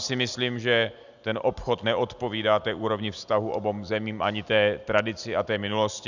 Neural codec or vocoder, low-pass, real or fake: none; 7.2 kHz; real